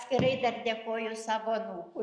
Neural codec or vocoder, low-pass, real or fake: none; 9.9 kHz; real